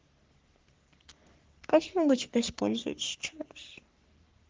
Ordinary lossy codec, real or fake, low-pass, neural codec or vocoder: Opus, 16 kbps; fake; 7.2 kHz; codec, 44.1 kHz, 3.4 kbps, Pupu-Codec